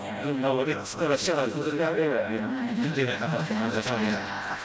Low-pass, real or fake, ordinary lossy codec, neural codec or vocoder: none; fake; none; codec, 16 kHz, 0.5 kbps, FreqCodec, smaller model